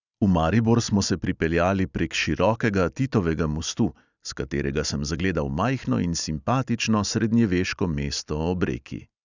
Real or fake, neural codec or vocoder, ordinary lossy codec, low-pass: real; none; none; 7.2 kHz